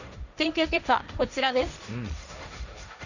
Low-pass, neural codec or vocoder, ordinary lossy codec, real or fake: 7.2 kHz; codec, 16 kHz, 1.1 kbps, Voila-Tokenizer; none; fake